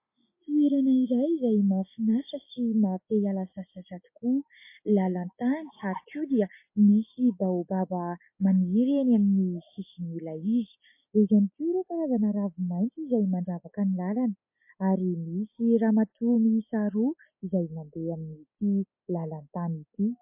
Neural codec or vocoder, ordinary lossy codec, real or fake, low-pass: none; MP3, 24 kbps; real; 3.6 kHz